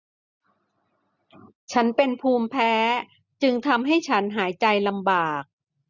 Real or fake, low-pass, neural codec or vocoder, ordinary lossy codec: real; 7.2 kHz; none; none